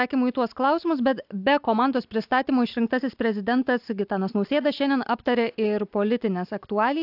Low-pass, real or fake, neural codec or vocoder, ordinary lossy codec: 5.4 kHz; real; none; AAC, 48 kbps